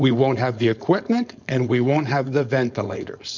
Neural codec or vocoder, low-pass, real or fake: codec, 16 kHz, 4.8 kbps, FACodec; 7.2 kHz; fake